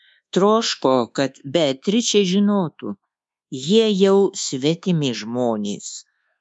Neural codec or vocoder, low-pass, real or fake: codec, 24 kHz, 1.2 kbps, DualCodec; 10.8 kHz; fake